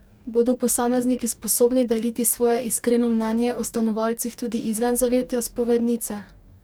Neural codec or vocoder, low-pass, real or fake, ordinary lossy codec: codec, 44.1 kHz, 2.6 kbps, DAC; none; fake; none